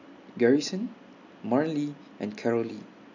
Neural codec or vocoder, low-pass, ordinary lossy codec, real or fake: none; 7.2 kHz; none; real